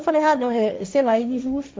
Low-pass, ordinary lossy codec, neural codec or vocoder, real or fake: none; none; codec, 16 kHz, 1.1 kbps, Voila-Tokenizer; fake